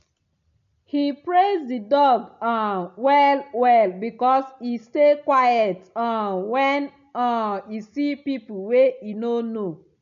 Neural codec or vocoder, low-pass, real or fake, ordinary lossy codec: none; 7.2 kHz; real; AAC, 96 kbps